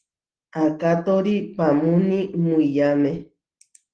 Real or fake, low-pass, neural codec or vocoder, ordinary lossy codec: real; 9.9 kHz; none; Opus, 16 kbps